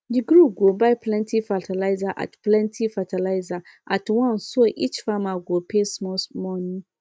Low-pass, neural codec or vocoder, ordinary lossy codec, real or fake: none; none; none; real